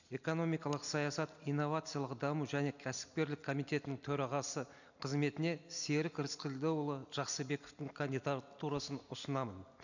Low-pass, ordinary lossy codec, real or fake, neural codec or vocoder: 7.2 kHz; none; real; none